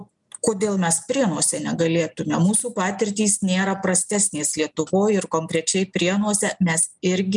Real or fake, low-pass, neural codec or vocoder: real; 10.8 kHz; none